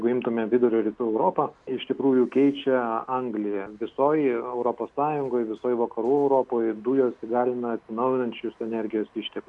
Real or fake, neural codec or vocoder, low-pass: real; none; 10.8 kHz